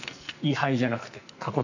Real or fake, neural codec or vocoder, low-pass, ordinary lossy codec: fake; codec, 44.1 kHz, 2.6 kbps, SNAC; 7.2 kHz; none